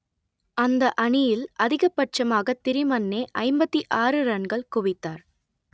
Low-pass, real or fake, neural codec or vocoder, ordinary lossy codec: none; real; none; none